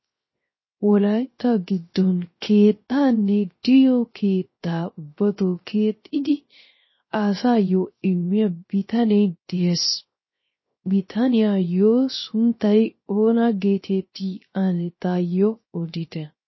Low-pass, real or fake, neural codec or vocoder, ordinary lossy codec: 7.2 kHz; fake; codec, 16 kHz, 0.3 kbps, FocalCodec; MP3, 24 kbps